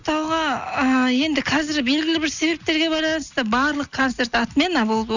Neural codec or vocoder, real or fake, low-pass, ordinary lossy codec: none; real; 7.2 kHz; none